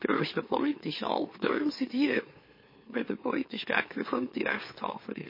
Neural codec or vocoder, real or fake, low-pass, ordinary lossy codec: autoencoder, 44.1 kHz, a latent of 192 numbers a frame, MeloTTS; fake; 5.4 kHz; MP3, 24 kbps